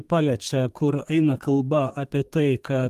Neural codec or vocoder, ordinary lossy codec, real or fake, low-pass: codec, 32 kHz, 1.9 kbps, SNAC; Opus, 32 kbps; fake; 14.4 kHz